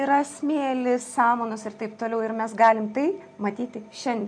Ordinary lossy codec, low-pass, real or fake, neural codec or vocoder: MP3, 48 kbps; 9.9 kHz; real; none